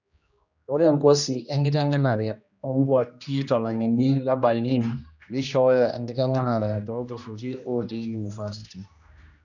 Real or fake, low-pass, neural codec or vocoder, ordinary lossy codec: fake; 7.2 kHz; codec, 16 kHz, 1 kbps, X-Codec, HuBERT features, trained on general audio; none